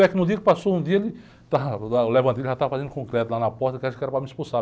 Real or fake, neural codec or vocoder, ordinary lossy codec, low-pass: real; none; none; none